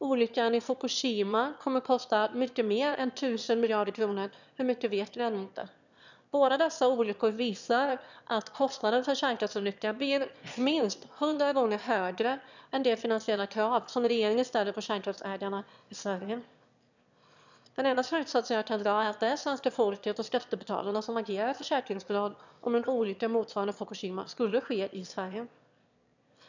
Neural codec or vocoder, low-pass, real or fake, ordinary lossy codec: autoencoder, 22.05 kHz, a latent of 192 numbers a frame, VITS, trained on one speaker; 7.2 kHz; fake; none